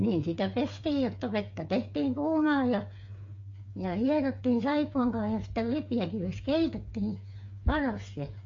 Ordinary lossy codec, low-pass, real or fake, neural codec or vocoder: MP3, 48 kbps; 7.2 kHz; fake; codec, 16 kHz, 8 kbps, FreqCodec, smaller model